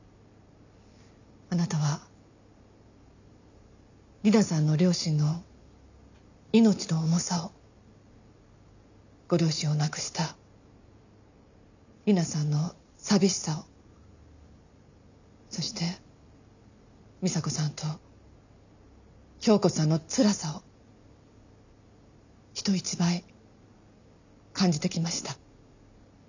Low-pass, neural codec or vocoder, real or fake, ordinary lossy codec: 7.2 kHz; none; real; none